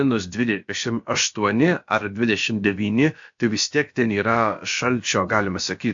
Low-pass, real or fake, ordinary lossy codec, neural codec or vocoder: 7.2 kHz; fake; AAC, 64 kbps; codec, 16 kHz, about 1 kbps, DyCAST, with the encoder's durations